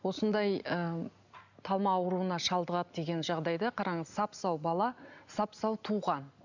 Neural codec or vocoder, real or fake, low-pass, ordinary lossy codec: none; real; 7.2 kHz; none